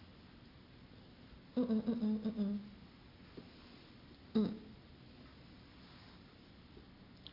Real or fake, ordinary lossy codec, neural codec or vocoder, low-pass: real; AAC, 24 kbps; none; 5.4 kHz